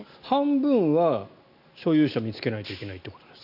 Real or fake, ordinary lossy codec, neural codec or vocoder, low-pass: real; none; none; 5.4 kHz